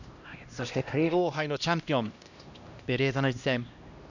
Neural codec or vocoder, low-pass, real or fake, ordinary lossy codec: codec, 16 kHz, 1 kbps, X-Codec, HuBERT features, trained on LibriSpeech; 7.2 kHz; fake; none